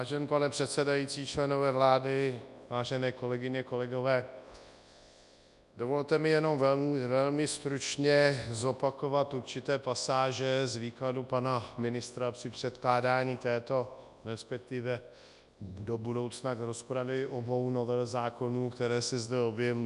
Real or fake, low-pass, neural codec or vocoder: fake; 10.8 kHz; codec, 24 kHz, 0.9 kbps, WavTokenizer, large speech release